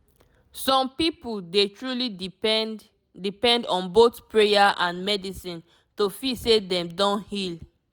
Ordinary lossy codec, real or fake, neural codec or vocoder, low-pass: none; real; none; none